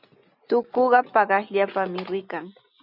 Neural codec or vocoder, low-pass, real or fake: none; 5.4 kHz; real